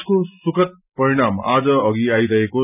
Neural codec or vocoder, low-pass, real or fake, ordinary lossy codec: none; 3.6 kHz; real; none